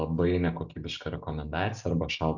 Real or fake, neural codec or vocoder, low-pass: real; none; 7.2 kHz